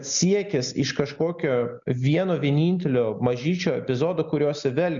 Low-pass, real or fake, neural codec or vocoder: 7.2 kHz; real; none